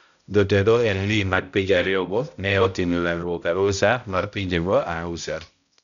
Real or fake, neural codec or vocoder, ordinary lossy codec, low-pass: fake; codec, 16 kHz, 0.5 kbps, X-Codec, HuBERT features, trained on balanced general audio; none; 7.2 kHz